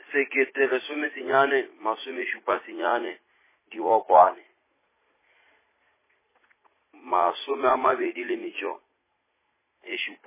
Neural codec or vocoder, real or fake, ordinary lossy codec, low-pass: vocoder, 44.1 kHz, 80 mel bands, Vocos; fake; MP3, 16 kbps; 3.6 kHz